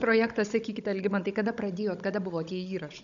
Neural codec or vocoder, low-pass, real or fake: codec, 16 kHz, 16 kbps, FunCodec, trained on Chinese and English, 50 frames a second; 7.2 kHz; fake